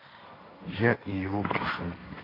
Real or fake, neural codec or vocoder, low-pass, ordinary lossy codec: fake; codec, 16 kHz, 1.1 kbps, Voila-Tokenizer; 5.4 kHz; AAC, 24 kbps